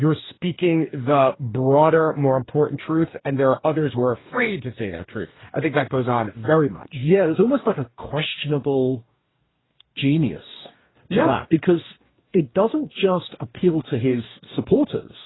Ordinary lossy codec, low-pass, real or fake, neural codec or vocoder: AAC, 16 kbps; 7.2 kHz; fake; codec, 44.1 kHz, 2.6 kbps, DAC